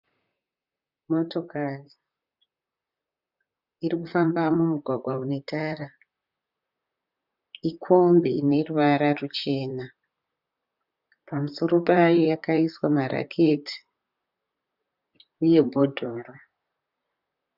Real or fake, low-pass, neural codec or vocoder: fake; 5.4 kHz; vocoder, 44.1 kHz, 128 mel bands, Pupu-Vocoder